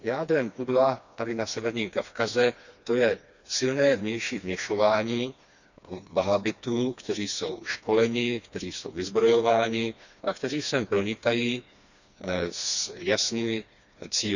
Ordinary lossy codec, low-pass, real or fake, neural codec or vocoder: none; 7.2 kHz; fake; codec, 16 kHz, 2 kbps, FreqCodec, smaller model